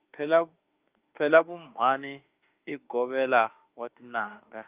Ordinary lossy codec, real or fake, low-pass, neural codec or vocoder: Opus, 24 kbps; fake; 3.6 kHz; autoencoder, 48 kHz, 32 numbers a frame, DAC-VAE, trained on Japanese speech